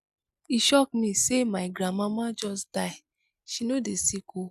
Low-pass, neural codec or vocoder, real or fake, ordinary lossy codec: 14.4 kHz; none; real; none